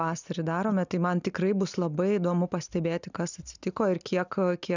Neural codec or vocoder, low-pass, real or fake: none; 7.2 kHz; real